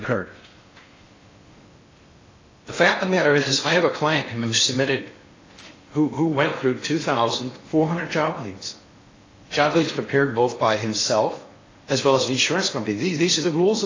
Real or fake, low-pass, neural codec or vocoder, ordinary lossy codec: fake; 7.2 kHz; codec, 16 kHz in and 24 kHz out, 0.6 kbps, FocalCodec, streaming, 4096 codes; AAC, 32 kbps